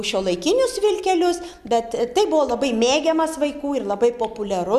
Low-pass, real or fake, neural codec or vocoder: 14.4 kHz; real; none